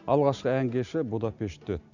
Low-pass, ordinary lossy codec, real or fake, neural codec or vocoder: 7.2 kHz; none; real; none